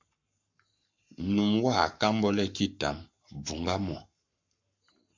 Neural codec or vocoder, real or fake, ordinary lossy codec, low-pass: codec, 44.1 kHz, 7.8 kbps, Pupu-Codec; fake; MP3, 64 kbps; 7.2 kHz